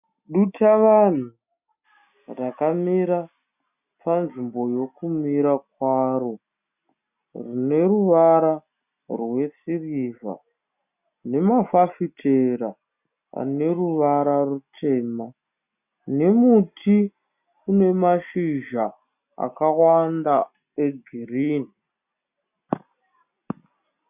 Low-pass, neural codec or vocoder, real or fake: 3.6 kHz; none; real